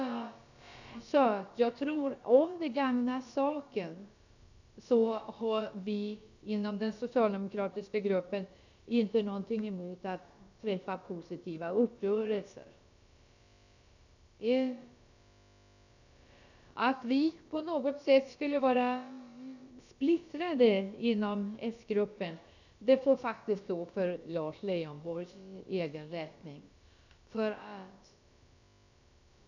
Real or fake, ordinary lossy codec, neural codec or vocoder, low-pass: fake; none; codec, 16 kHz, about 1 kbps, DyCAST, with the encoder's durations; 7.2 kHz